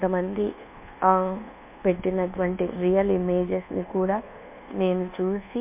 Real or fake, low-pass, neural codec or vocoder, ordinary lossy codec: fake; 3.6 kHz; codec, 24 kHz, 1.2 kbps, DualCodec; MP3, 32 kbps